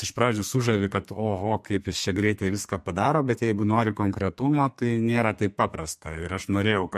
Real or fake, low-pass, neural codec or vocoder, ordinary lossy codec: fake; 14.4 kHz; codec, 32 kHz, 1.9 kbps, SNAC; MP3, 64 kbps